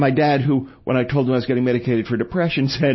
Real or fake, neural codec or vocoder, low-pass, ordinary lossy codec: real; none; 7.2 kHz; MP3, 24 kbps